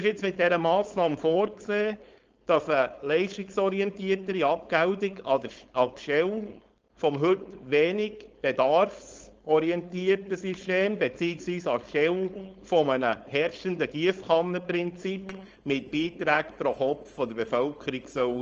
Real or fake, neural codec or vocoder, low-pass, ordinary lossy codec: fake; codec, 16 kHz, 4.8 kbps, FACodec; 7.2 kHz; Opus, 24 kbps